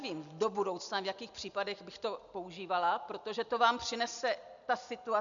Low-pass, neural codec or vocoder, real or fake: 7.2 kHz; none; real